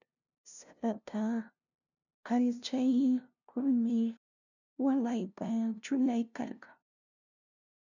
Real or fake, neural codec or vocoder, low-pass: fake; codec, 16 kHz, 0.5 kbps, FunCodec, trained on LibriTTS, 25 frames a second; 7.2 kHz